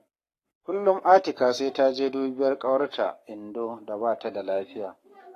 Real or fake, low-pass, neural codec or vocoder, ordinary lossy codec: fake; 14.4 kHz; codec, 44.1 kHz, 7.8 kbps, Pupu-Codec; AAC, 48 kbps